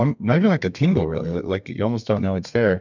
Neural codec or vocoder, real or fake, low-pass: codec, 32 kHz, 1.9 kbps, SNAC; fake; 7.2 kHz